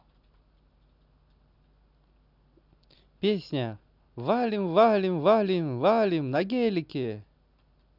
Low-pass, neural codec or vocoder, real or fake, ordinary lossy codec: 5.4 kHz; none; real; none